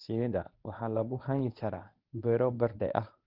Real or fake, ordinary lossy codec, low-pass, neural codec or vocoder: fake; Opus, 24 kbps; 5.4 kHz; codec, 24 kHz, 0.9 kbps, WavTokenizer, medium speech release version 1